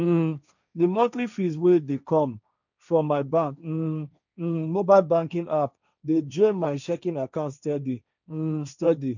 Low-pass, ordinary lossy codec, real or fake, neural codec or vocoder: 7.2 kHz; none; fake; codec, 16 kHz, 1.1 kbps, Voila-Tokenizer